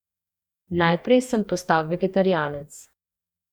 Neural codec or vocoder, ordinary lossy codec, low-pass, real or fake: codec, 44.1 kHz, 2.6 kbps, DAC; none; 19.8 kHz; fake